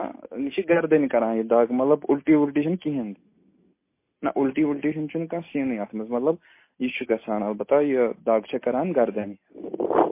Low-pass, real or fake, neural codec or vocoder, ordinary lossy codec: 3.6 kHz; real; none; MP3, 24 kbps